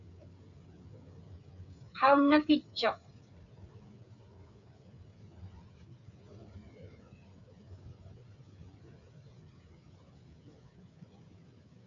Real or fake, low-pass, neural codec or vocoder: fake; 7.2 kHz; codec, 16 kHz, 8 kbps, FreqCodec, smaller model